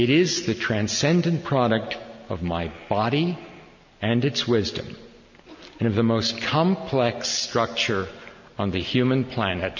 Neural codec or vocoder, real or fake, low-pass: none; real; 7.2 kHz